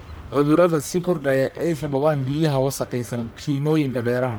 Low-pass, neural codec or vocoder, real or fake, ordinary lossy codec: none; codec, 44.1 kHz, 1.7 kbps, Pupu-Codec; fake; none